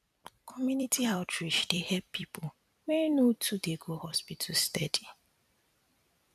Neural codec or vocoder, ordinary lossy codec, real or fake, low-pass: vocoder, 44.1 kHz, 128 mel bands every 256 samples, BigVGAN v2; MP3, 96 kbps; fake; 14.4 kHz